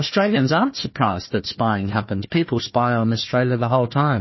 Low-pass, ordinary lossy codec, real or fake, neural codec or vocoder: 7.2 kHz; MP3, 24 kbps; fake; codec, 16 kHz, 1 kbps, FunCodec, trained on Chinese and English, 50 frames a second